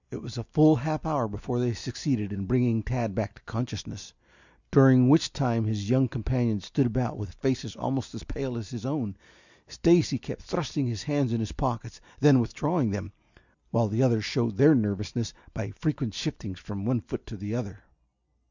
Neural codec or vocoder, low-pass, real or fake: none; 7.2 kHz; real